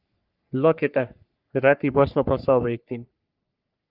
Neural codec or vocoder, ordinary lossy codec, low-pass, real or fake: codec, 44.1 kHz, 3.4 kbps, Pupu-Codec; Opus, 32 kbps; 5.4 kHz; fake